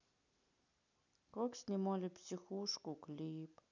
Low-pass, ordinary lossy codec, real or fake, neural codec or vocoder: 7.2 kHz; none; real; none